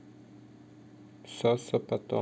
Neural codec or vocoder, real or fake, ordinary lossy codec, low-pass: none; real; none; none